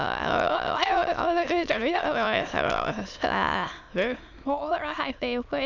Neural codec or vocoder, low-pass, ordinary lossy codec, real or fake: autoencoder, 22.05 kHz, a latent of 192 numbers a frame, VITS, trained on many speakers; 7.2 kHz; none; fake